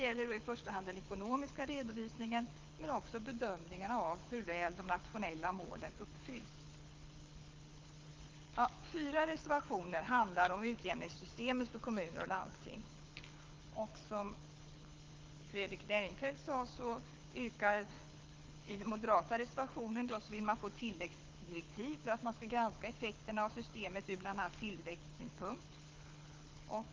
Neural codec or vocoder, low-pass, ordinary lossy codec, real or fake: codec, 24 kHz, 6 kbps, HILCodec; 7.2 kHz; Opus, 24 kbps; fake